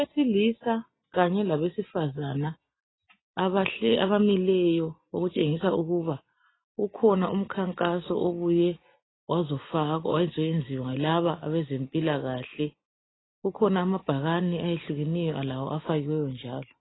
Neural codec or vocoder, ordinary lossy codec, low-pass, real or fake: none; AAC, 16 kbps; 7.2 kHz; real